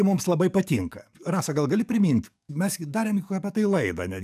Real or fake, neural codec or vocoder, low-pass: fake; codec, 44.1 kHz, 7.8 kbps, DAC; 14.4 kHz